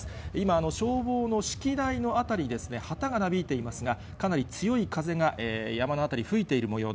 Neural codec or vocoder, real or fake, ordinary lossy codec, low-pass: none; real; none; none